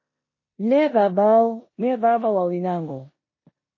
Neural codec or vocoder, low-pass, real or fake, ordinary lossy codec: codec, 16 kHz in and 24 kHz out, 0.9 kbps, LongCat-Audio-Codec, four codebook decoder; 7.2 kHz; fake; MP3, 32 kbps